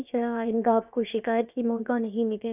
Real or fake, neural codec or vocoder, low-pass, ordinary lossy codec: fake; codec, 16 kHz, about 1 kbps, DyCAST, with the encoder's durations; 3.6 kHz; none